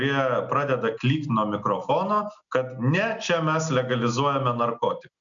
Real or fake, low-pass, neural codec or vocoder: real; 7.2 kHz; none